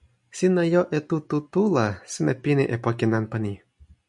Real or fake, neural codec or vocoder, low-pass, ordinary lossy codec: real; none; 10.8 kHz; MP3, 96 kbps